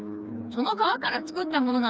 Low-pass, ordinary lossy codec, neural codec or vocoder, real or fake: none; none; codec, 16 kHz, 2 kbps, FreqCodec, smaller model; fake